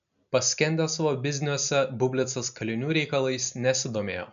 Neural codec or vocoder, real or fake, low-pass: none; real; 7.2 kHz